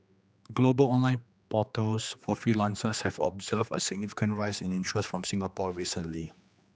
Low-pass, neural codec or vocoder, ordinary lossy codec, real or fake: none; codec, 16 kHz, 2 kbps, X-Codec, HuBERT features, trained on general audio; none; fake